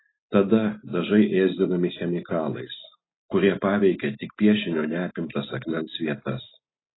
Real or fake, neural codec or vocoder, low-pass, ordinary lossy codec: real; none; 7.2 kHz; AAC, 16 kbps